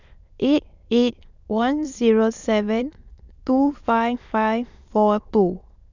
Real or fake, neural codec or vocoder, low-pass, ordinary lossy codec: fake; autoencoder, 22.05 kHz, a latent of 192 numbers a frame, VITS, trained on many speakers; 7.2 kHz; none